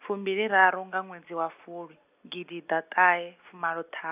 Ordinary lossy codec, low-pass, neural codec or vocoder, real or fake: AAC, 32 kbps; 3.6 kHz; none; real